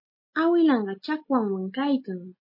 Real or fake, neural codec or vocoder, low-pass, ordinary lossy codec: real; none; 5.4 kHz; MP3, 24 kbps